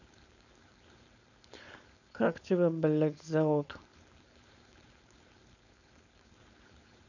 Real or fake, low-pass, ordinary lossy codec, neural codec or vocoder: fake; 7.2 kHz; none; codec, 16 kHz, 4.8 kbps, FACodec